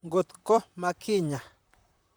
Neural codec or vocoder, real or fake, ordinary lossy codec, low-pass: vocoder, 44.1 kHz, 128 mel bands, Pupu-Vocoder; fake; none; none